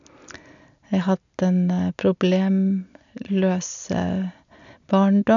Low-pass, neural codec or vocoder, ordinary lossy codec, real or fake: 7.2 kHz; none; none; real